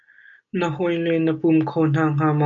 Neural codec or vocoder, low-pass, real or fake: none; 7.2 kHz; real